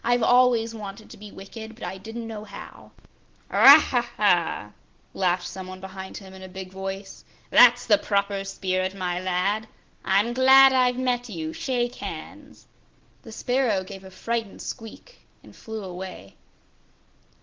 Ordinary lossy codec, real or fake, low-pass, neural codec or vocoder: Opus, 16 kbps; real; 7.2 kHz; none